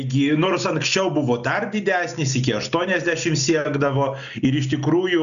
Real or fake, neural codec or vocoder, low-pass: real; none; 7.2 kHz